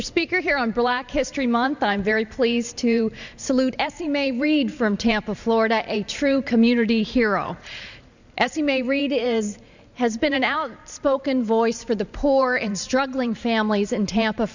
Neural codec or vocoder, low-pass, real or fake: vocoder, 44.1 kHz, 128 mel bands every 512 samples, BigVGAN v2; 7.2 kHz; fake